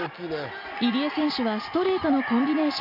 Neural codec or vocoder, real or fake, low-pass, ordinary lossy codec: none; real; 5.4 kHz; none